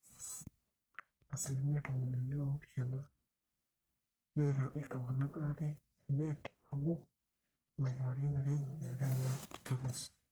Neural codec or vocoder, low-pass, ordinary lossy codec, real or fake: codec, 44.1 kHz, 1.7 kbps, Pupu-Codec; none; none; fake